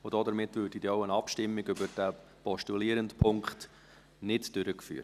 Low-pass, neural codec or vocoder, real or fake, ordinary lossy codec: 14.4 kHz; none; real; none